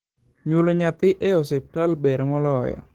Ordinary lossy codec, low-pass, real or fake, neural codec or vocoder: Opus, 16 kbps; 19.8 kHz; fake; codec, 44.1 kHz, 7.8 kbps, DAC